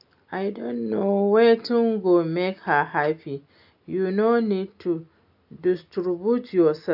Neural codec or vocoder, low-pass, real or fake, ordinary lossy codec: none; 5.4 kHz; real; AAC, 48 kbps